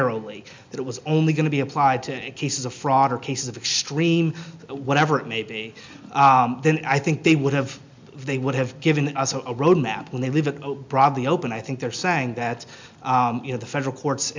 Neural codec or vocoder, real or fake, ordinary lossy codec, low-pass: none; real; MP3, 64 kbps; 7.2 kHz